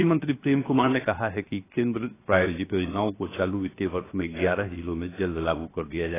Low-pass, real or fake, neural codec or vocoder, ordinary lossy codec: 3.6 kHz; fake; codec, 16 kHz, 0.7 kbps, FocalCodec; AAC, 16 kbps